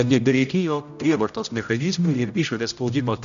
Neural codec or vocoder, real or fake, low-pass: codec, 16 kHz, 0.5 kbps, X-Codec, HuBERT features, trained on general audio; fake; 7.2 kHz